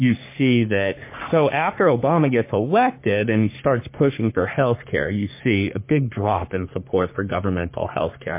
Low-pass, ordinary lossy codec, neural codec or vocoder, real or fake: 3.6 kHz; MP3, 24 kbps; codec, 44.1 kHz, 3.4 kbps, Pupu-Codec; fake